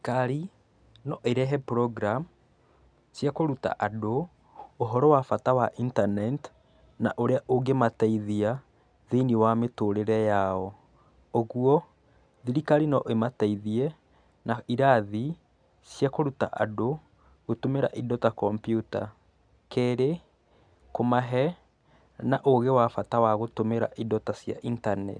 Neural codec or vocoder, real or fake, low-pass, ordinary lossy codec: none; real; 9.9 kHz; none